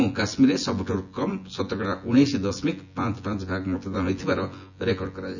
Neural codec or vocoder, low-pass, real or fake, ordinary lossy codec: vocoder, 24 kHz, 100 mel bands, Vocos; 7.2 kHz; fake; none